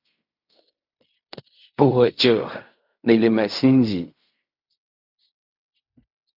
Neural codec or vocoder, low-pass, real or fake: codec, 16 kHz in and 24 kHz out, 0.4 kbps, LongCat-Audio-Codec, fine tuned four codebook decoder; 5.4 kHz; fake